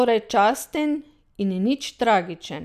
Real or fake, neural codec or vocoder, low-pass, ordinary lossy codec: real; none; 14.4 kHz; none